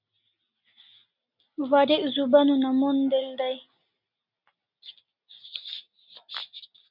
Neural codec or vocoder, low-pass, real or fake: none; 5.4 kHz; real